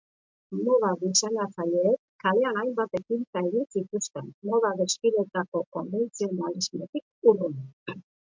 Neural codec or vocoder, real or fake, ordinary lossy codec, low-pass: none; real; MP3, 64 kbps; 7.2 kHz